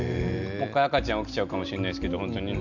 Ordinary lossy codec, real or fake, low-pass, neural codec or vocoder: none; real; 7.2 kHz; none